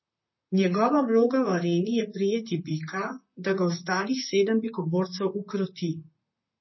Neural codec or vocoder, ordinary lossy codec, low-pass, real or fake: vocoder, 44.1 kHz, 128 mel bands, Pupu-Vocoder; MP3, 24 kbps; 7.2 kHz; fake